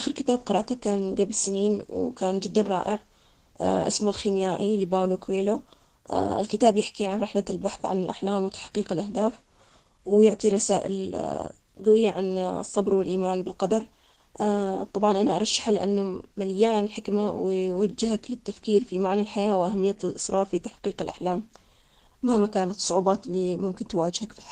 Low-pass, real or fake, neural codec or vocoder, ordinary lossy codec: 14.4 kHz; fake; codec, 32 kHz, 1.9 kbps, SNAC; Opus, 16 kbps